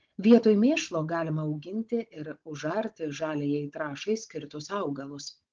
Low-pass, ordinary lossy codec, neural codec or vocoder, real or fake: 7.2 kHz; Opus, 16 kbps; codec, 16 kHz, 16 kbps, FunCodec, trained on Chinese and English, 50 frames a second; fake